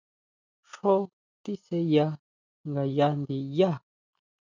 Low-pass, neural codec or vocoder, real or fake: 7.2 kHz; none; real